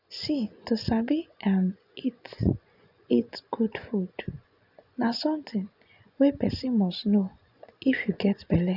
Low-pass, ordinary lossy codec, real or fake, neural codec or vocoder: 5.4 kHz; none; fake; vocoder, 24 kHz, 100 mel bands, Vocos